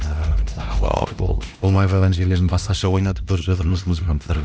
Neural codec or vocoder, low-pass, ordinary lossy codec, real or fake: codec, 16 kHz, 1 kbps, X-Codec, HuBERT features, trained on LibriSpeech; none; none; fake